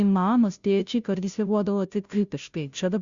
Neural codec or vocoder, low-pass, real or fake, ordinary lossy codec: codec, 16 kHz, 0.5 kbps, FunCodec, trained on Chinese and English, 25 frames a second; 7.2 kHz; fake; Opus, 64 kbps